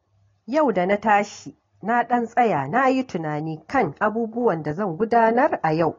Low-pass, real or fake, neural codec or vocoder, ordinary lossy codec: 7.2 kHz; real; none; AAC, 32 kbps